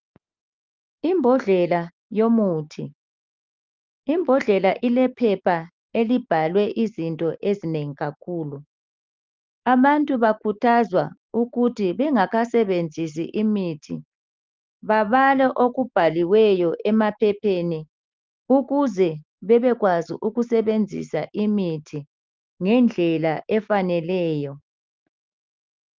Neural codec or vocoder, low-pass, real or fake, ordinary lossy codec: none; 7.2 kHz; real; Opus, 32 kbps